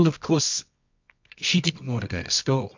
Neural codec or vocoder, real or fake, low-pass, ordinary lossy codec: codec, 24 kHz, 0.9 kbps, WavTokenizer, medium music audio release; fake; 7.2 kHz; MP3, 64 kbps